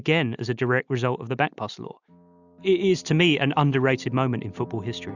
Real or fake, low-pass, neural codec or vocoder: real; 7.2 kHz; none